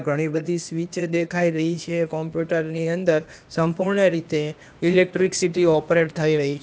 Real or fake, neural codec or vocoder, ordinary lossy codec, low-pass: fake; codec, 16 kHz, 0.8 kbps, ZipCodec; none; none